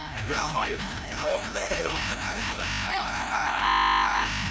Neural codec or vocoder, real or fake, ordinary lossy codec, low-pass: codec, 16 kHz, 0.5 kbps, FreqCodec, larger model; fake; none; none